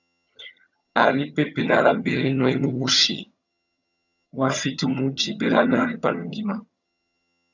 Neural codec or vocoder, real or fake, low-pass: vocoder, 22.05 kHz, 80 mel bands, HiFi-GAN; fake; 7.2 kHz